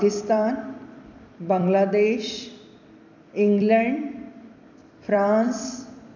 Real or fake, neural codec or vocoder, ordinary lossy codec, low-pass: fake; vocoder, 44.1 kHz, 128 mel bands every 256 samples, BigVGAN v2; none; 7.2 kHz